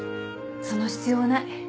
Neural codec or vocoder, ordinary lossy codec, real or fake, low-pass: none; none; real; none